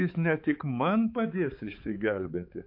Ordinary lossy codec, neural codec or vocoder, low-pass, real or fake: MP3, 48 kbps; codec, 16 kHz, 4 kbps, X-Codec, HuBERT features, trained on balanced general audio; 5.4 kHz; fake